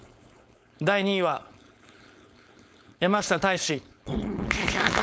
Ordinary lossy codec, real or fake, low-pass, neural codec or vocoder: none; fake; none; codec, 16 kHz, 4.8 kbps, FACodec